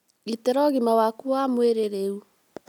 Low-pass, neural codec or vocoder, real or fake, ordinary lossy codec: 19.8 kHz; none; real; none